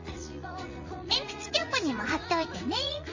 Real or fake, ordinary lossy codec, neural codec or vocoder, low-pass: fake; MP3, 32 kbps; vocoder, 44.1 kHz, 80 mel bands, Vocos; 7.2 kHz